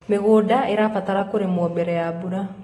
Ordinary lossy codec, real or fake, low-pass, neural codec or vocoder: AAC, 32 kbps; real; 19.8 kHz; none